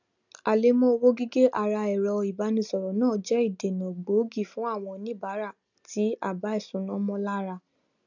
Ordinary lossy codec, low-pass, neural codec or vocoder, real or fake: none; 7.2 kHz; none; real